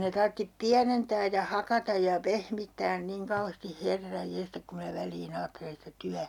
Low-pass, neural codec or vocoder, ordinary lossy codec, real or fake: 19.8 kHz; none; none; real